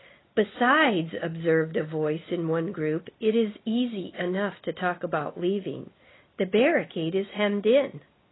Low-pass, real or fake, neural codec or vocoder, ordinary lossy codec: 7.2 kHz; real; none; AAC, 16 kbps